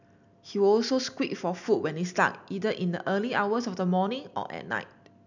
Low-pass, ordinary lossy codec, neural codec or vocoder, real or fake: 7.2 kHz; none; none; real